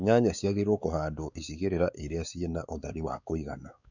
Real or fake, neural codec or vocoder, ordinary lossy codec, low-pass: fake; codec, 16 kHz, 4 kbps, X-Codec, WavLM features, trained on Multilingual LibriSpeech; none; 7.2 kHz